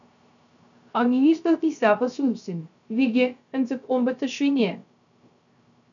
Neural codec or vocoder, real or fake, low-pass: codec, 16 kHz, 0.3 kbps, FocalCodec; fake; 7.2 kHz